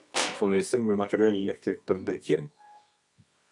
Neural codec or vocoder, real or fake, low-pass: codec, 24 kHz, 0.9 kbps, WavTokenizer, medium music audio release; fake; 10.8 kHz